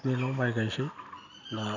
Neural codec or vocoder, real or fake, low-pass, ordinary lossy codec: none; real; 7.2 kHz; none